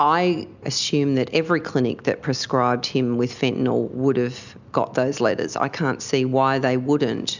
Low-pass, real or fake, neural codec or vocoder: 7.2 kHz; real; none